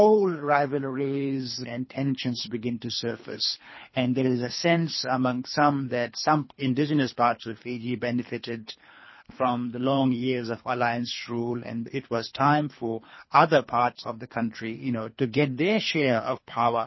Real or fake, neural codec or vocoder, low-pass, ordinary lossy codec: fake; codec, 24 kHz, 3 kbps, HILCodec; 7.2 kHz; MP3, 24 kbps